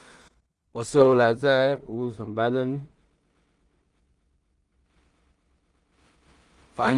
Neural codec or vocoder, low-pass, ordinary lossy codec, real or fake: codec, 16 kHz in and 24 kHz out, 0.4 kbps, LongCat-Audio-Codec, two codebook decoder; 10.8 kHz; Opus, 32 kbps; fake